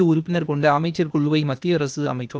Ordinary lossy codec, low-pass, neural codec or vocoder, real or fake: none; none; codec, 16 kHz, 0.8 kbps, ZipCodec; fake